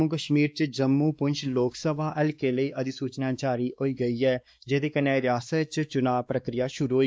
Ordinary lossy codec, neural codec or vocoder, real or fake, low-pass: none; codec, 16 kHz, 2 kbps, X-Codec, WavLM features, trained on Multilingual LibriSpeech; fake; none